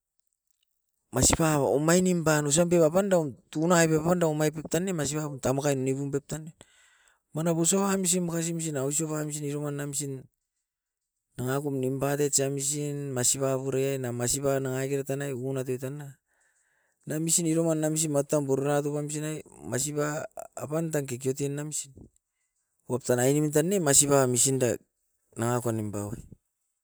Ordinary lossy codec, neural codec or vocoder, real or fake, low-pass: none; none; real; none